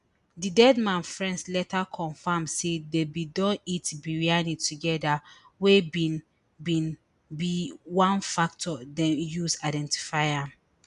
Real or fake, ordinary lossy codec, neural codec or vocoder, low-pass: real; none; none; 10.8 kHz